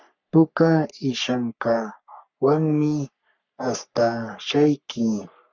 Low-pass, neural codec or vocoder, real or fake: 7.2 kHz; codec, 44.1 kHz, 3.4 kbps, Pupu-Codec; fake